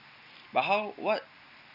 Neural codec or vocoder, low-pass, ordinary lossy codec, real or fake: none; 5.4 kHz; none; real